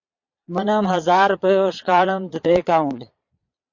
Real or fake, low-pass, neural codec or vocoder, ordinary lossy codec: fake; 7.2 kHz; vocoder, 22.05 kHz, 80 mel bands, Vocos; MP3, 48 kbps